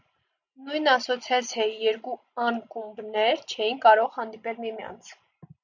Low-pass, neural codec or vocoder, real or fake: 7.2 kHz; none; real